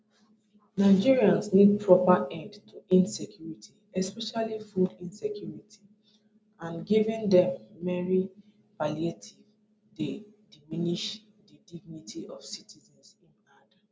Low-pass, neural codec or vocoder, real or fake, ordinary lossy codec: none; none; real; none